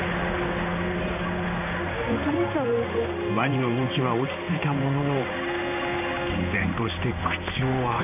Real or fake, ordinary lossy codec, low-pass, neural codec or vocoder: fake; none; 3.6 kHz; codec, 16 kHz in and 24 kHz out, 1 kbps, XY-Tokenizer